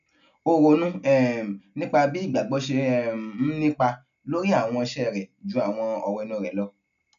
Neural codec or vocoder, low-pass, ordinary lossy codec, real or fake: none; 7.2 kHz; none; real